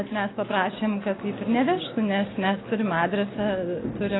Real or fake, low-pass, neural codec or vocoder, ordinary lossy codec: real; 7.2 kHz; none; AAC, 16 kbps